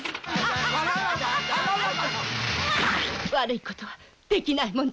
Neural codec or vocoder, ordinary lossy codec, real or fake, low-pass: none; none; real; none